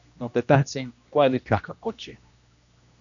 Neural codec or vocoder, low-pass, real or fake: codec, 16 kHz, 1 kbps, X-Codec, HuBERT features, trained on general audio; 7.2 kHz; fake